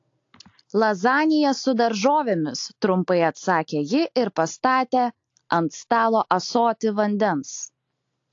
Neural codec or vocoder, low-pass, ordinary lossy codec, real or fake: none; 7.2 kHz; AAC, 48 kbps; real